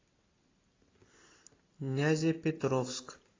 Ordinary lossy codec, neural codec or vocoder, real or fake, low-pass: AAC, 32 kbps; none; real; 7.2 kHz